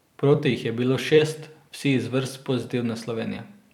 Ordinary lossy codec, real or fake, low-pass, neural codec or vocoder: none; fake; 19.8 kHz; vocoder, 44.1 kHz, 128 mel bands every 256 samples, BigVGAN v2